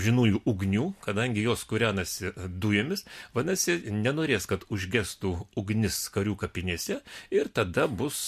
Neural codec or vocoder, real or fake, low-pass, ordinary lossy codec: none; real; 14.4 kHz; MP3, 64 kbps